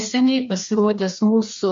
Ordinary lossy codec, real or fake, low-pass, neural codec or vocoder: MP3, 48 kbps; fake; 7.2 kHz; codec, 16 kHz, 2 kbps, FreqCodec, larger model